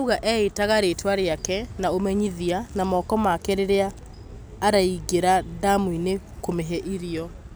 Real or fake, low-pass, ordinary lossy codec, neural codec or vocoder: real; none; none; none